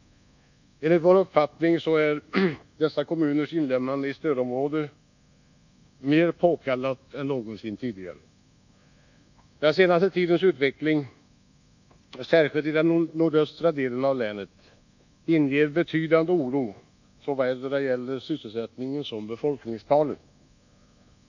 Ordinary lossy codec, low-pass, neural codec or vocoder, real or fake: none; 7.2 kHz; codec, 24 kHz, 1.2 kbps, DualCodec; fake